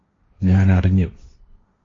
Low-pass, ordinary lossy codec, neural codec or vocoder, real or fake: 7.2 kHz; AAC, 32 kbps; codec, 16 kHz, 1.1 kbps, Voila-Tokenizer; fake